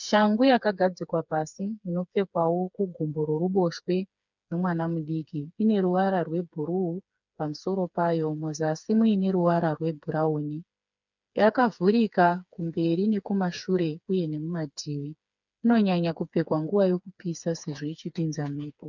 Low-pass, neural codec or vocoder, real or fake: 7.2 kHz; codec, 16 kHz, 4 kbps, FreqCodec, smaller model; fake